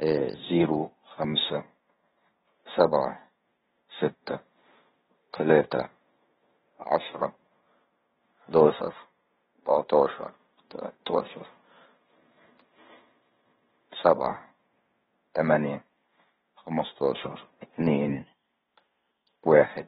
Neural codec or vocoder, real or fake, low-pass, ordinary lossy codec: codec, 16 kHz in and 24 kHz out, 0.9 kbps, LongCat-Audio-Codec, fine tuned four codebook decoder; fake; 10.8 kHz; AAC, 16 kbps